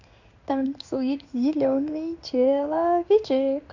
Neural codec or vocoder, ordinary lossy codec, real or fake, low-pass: codec, 16 kHz in and 24 kHz out, 1 kbps, XY-Tokenizer; none; fake; 7.2 kHz